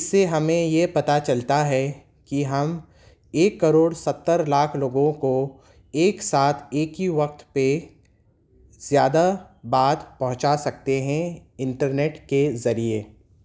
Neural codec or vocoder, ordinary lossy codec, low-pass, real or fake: none; none; none; real